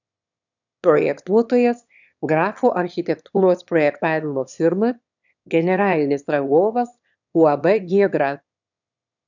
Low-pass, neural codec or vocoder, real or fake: 7.2 kHz; autoencoder, 22.05 kHz, a latent of 192 numbers a frame, VITS, trained on one speaker; fake